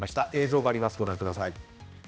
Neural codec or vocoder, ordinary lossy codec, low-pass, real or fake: codec, 16 kHz, 1 kbps, X-Codec, HuBERT features, trained on balanced general audio; none; none; fake